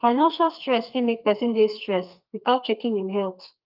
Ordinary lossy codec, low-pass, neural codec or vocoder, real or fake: Opus, 24 kbps; 5.4 kHz; codec, 32 kHz, 1.9 kbps, SNAC; fake